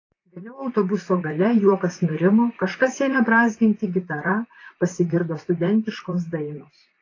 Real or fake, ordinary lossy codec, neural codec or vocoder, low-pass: fake; AAC, 32 kbps; vocoder, 44.1 kHz, 128 mel bands, Pupu-Vocoder; 7.2 kHz